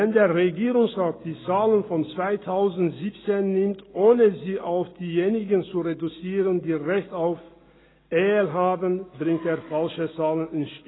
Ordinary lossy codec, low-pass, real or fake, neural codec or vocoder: AAC, 16 kbps; 7.2 kHz; real; none